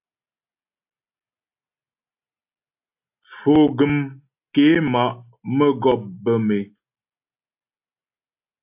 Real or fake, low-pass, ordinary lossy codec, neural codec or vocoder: real; 3.6 kHz; AAC, 32 kbps; none